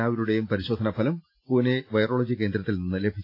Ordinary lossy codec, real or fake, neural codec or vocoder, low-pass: AAC, 32 kbps; real; none; 5.4 kHz